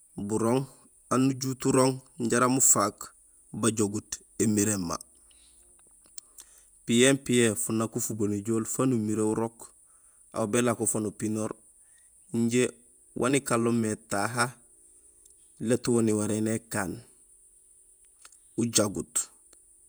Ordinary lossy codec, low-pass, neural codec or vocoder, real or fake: none; none; vocoder, 48 kHz, 128 mel bands, Vocos; fake